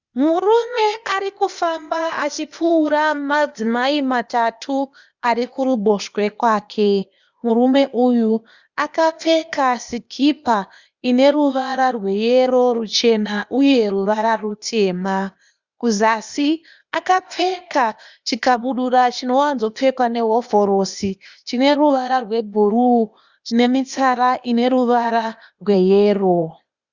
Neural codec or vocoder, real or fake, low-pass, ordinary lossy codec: codec, 16 kHz, 0.8 kbps, ZipCodec; fake; 7.2 kHz; Opus, 64 kbps